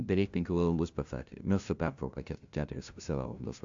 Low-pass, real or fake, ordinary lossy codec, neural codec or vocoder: 7.2 kHz; fake; AAC, 64 kbps; codec, 16 kHz, 0.5 kbps, FunCodec, trained on LibriTTS, 25 frames a second